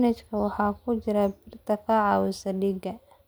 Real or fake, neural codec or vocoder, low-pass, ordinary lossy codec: real; none; none; none